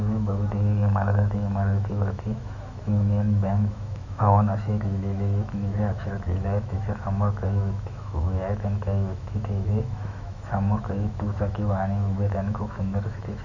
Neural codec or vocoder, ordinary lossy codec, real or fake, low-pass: autoencoder, 48 kHz, 128 numbers a frame, DAC-VAE, trained on Japanese speech; none; fake; 7.2 kHz